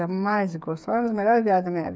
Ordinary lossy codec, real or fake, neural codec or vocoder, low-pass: none; fake; codec, 16 kHz, 8 kbps, FreqCodec, smaller model; none